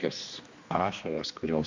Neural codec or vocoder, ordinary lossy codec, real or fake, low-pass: codec, 16 kHz, 2 kbps, X-Codec, HuBERT features, trained on general audio; MP3, 48 kbps; fake; 7.2 kHz